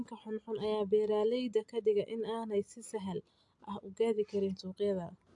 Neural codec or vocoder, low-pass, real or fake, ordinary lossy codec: none; 10.8 kHz; real; AAC, 64 kbps